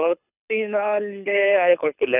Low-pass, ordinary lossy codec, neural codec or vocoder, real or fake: 3.6 kHz; none; codec, 24 kHz, 6 kbps, HILCodec; fake